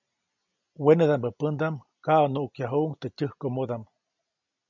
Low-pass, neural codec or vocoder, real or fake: 7.2 kHz; none; real